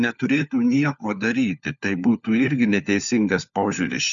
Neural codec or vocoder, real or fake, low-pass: codec, 16 kHz, 4 kbps, FunCodec, trained on LibriTTS, 50 frames a second; fake; 7.2 kHz